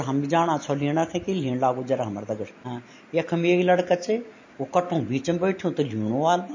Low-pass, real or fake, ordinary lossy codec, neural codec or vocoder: 7.2 kHz; real; MP3, 32 kbps; none